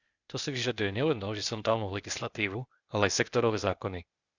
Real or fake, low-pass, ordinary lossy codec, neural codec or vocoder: fake; 7.2 kHz; Opus, 64 kbps; codec, 16 kHz, 0.8 kbps, ZipCodec